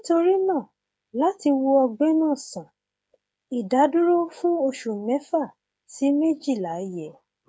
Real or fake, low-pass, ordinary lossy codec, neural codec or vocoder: fake; none; none; codec, 16 kHz, 8 kbps, FreqCodec, smaller model